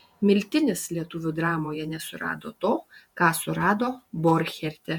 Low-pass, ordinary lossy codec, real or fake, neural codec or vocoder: 19.8 kHz; MP3, 96 kbps; fake; vocoder, 48 kHz, 128 mel bands, Vocos